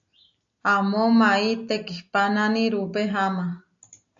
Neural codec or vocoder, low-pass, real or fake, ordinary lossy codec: none; 7.2 kHz; real; AAC, 64 kbps